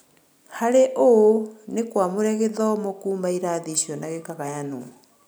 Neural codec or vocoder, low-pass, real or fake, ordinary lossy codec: none; none; real; none